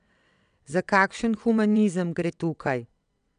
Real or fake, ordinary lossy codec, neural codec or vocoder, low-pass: fake; none; vocoder, 22.05 kHz, 80 mel bands, WaveNeXt; 9.9 kHz